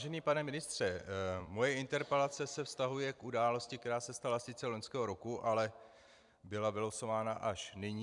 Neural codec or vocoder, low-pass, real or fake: vocoder, 44.1 kHz, 128 mel bands every 256 samples, BigVGAN v2; 10.8 kHz; fake